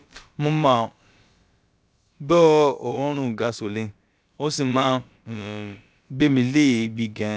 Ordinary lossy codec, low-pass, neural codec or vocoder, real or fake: none; none; codec, 16 kHz, about 1 kbps, DyCAST, with the encoder's durations; fake